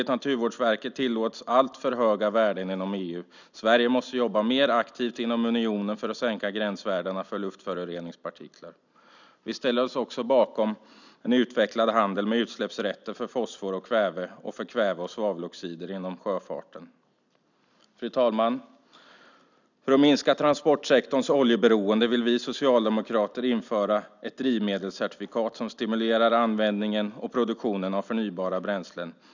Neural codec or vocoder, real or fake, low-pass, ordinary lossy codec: none; real; 7.2 kHz; none